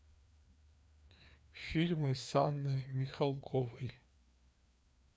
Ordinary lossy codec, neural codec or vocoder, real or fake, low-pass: none; codec, 16 kHz, 2 kbps, FreqCodec, larger model; fake; none